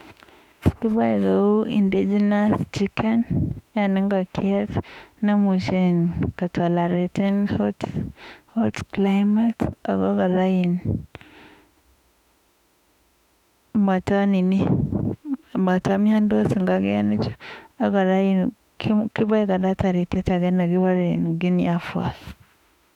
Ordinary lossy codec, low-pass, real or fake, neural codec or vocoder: none; 19.8 kHz; fake; autoencoder, 48 kHz, 32 numbers a frame, DAC-VAE, trained on Japanese speech